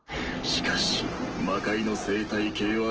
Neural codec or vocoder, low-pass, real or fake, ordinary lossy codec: none; 7.2 kHz; real; Opus, 16 kbps